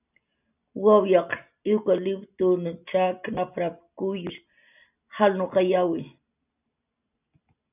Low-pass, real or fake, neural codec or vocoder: 3.6 kHz; real; none